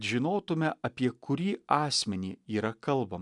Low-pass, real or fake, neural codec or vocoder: 10.8 kHz; real; none